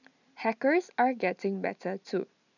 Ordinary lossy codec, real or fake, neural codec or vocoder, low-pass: none; real; none; 7.2 kHz